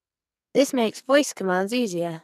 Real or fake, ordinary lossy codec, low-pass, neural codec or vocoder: fake; none; 14.4 kHz; codec, 44.1 kHz, 2.6 kbps, SNAC